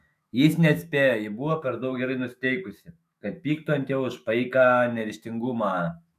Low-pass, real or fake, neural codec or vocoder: 14.4 kHz; fake; autoencoder, 48 kHz, 128 numbers a frame, DAC-VAE, trained on Japanese speech